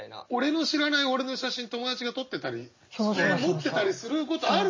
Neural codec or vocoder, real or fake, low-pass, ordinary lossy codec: none; real; 7.2 kHz; MP3, 32 kbps